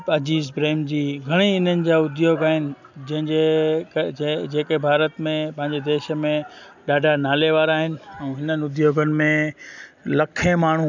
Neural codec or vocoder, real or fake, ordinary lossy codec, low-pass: none; real; none; 7.2 kHz